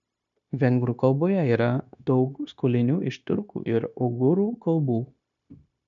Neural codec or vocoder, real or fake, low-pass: codec, 16 kHz, 0.9 kbps, LongCat-Audio-Codec; fake; 7.2 kHz